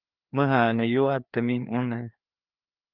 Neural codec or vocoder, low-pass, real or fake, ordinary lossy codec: codec, 16 kHz, 2 kbps, FreqCodec, larger model; 5.4 kHz; fake; Opus, 32 kbps